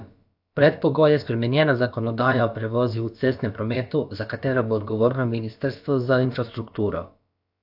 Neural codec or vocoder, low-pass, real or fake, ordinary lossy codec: codec, 16 kHz, about 1 kbps, DyCAST, with the encoder's durations; 5.4 kHz; fake; AAC, 48 kbps